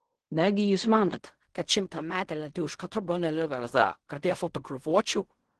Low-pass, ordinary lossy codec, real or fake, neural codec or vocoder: 10.8 kHz; Opus, 16 kbps; fake; codec, 16 kHz in and 24 kHz out, 0.4 kbps, LongCat-Audio-Codec, fine tuned four codebook decoder